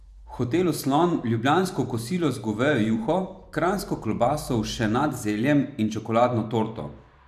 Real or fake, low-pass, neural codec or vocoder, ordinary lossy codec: fake; 14.4 kHz; vocoder, 44.1 kHz, 128 mel bands every 512 samples, BigVGAN v2; none